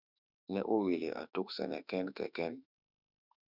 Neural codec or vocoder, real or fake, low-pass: autoencoder, 48 kHz, 32 numbers a frame, DAC-VAE, trained on Japanese speech; fake; 5.4 kHz